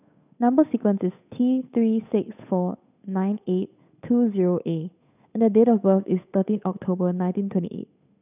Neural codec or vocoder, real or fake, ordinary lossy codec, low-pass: codec, 16 kHz, 8 kbps, FunCodec, trained on Chinese and English, 25 frames a second; fake; none; 3.6 kHz